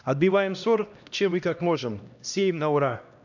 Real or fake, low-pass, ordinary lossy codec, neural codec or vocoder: fake; 7.2 kHz; none; codec, 16 kHz, 1 kbps, X-Codec, HuBERT features, trained on LibriSpeech